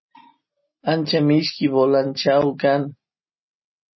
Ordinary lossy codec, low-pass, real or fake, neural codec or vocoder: MP3, 24 kbps; 7.2 kHz; real; none